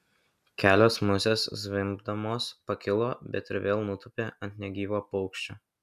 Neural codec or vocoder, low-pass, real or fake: none; 14.4 kHz; real